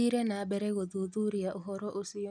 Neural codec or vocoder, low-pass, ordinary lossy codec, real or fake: none; 9.9 kHz; none; real